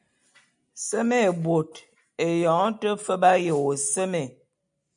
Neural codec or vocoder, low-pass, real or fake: none; 9.9 kHz; real